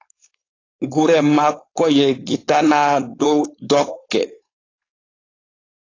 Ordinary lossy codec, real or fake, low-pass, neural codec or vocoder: AAC, 32 kbps; fake; 7.2 kHz; codec, 16 kHz, 4.8 kbps, FACodec